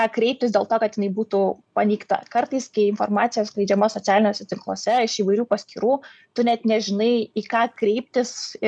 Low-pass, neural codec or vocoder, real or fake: 9.9 kHz; none; real